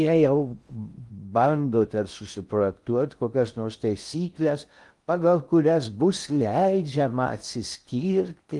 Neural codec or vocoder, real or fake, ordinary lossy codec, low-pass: codec, 16 kHz in and 24 kHz out, 0.6 kbps, FocalCodec, streaming, 2048 codes; fake; Opus, 32 kbps; 10.8 kHz